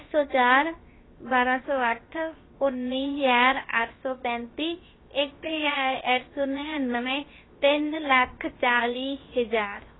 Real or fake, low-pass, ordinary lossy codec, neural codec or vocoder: fake; 7.2 kHz; AAC, 16 kbps; codec, 16 kHz, about 1 kbps, DyCAST, with the encoder's durations